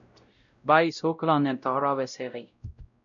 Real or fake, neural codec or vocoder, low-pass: fake; codec, 16 kHz, 0.5 kbps, X-Codec, WavLM features, trained on Multilingual LibriSpeech; 7.2 kHz